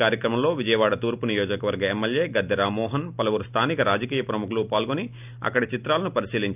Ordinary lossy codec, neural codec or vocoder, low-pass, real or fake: none; none; 3.6 kHz; real